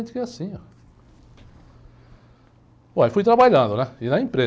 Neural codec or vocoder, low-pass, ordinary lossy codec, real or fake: none; none; none; real